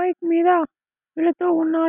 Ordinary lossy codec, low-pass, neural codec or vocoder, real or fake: none; 3.6 kHz; none; real